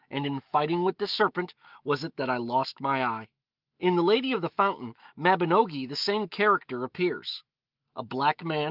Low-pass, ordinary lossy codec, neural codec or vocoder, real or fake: 5.4 kHz; Opus, 32 kbps; autoencoder, 48 kHz, 128 numbers a frame, DAC-VAE, trained on Japanese speech; fake